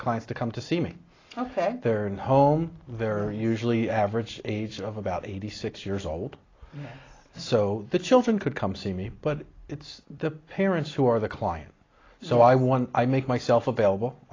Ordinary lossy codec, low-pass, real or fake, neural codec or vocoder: AAC, 32 kbps; 7.2 kHz; real; none